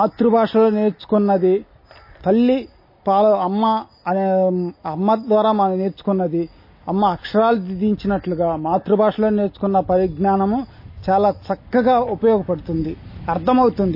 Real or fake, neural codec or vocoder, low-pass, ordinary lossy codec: real; none; 5.4 kHz; MP3, 24 kbps